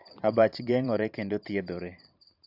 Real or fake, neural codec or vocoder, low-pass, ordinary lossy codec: fake; vocoder, 44.1 kHz, 128 mel bands every 512 samples, BigVGAN v2; 5.4 kHz; AAC, 48 kbps